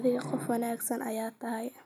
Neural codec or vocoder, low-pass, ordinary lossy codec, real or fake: none; 19.8 kHz; none; real